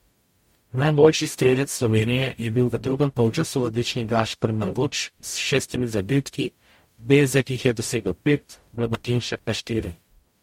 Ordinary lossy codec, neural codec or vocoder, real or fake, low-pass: MP3, 64 kbps; codec, 44.1 kHz, 0.9 kbps, DAC; fake; 19.8 kHz